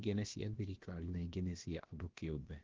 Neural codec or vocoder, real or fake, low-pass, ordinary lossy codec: codec, 16 kHz, about 1 kbps, DyCAST, with the encoder's durations; fake; 7.2 kHz; Opus, 16 kbps